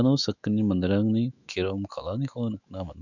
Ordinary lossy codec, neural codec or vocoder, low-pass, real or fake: none; autoencoder, 48 kHz, 128 numbers a frame, DAC-VAE, trained on Japanese speech; 7.2 kHz; fake